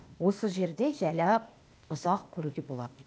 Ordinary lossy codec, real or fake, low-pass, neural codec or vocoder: none; fake; none; codec, 16 kHz, 0.8 kbps, ZipCodec